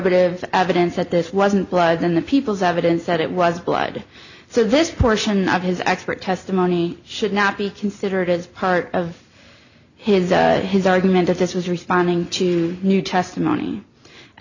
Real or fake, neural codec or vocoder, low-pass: real; none; 7.2 kHz